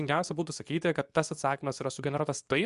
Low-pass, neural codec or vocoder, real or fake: 10.8 kHz; codec, 24 kHz, 0.9 kbps, WavTokenizer, medium speech release version 2; fake